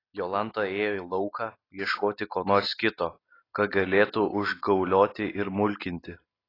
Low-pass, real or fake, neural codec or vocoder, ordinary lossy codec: 5.4 kHz; real; none; AAC, 24 kbps